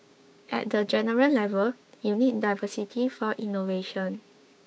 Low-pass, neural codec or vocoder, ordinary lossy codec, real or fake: none; codec, 16 kHz, 6 kbps, DAC; none; fake